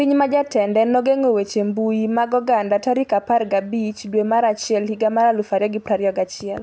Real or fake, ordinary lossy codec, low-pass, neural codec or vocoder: real; none; none; none